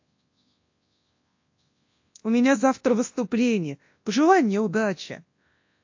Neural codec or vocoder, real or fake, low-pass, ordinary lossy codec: codec, 24 kHz, 0.9 kbps, WavTokenizer, large speech release; fake; 7.2 kHz; AAC, 48 kbps